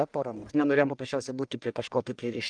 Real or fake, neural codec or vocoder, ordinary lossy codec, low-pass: fake; codec, 44.1 kHz, 1.7 kbps, Pupu-Codec; Opus, 32 kbps; 9.9 kHz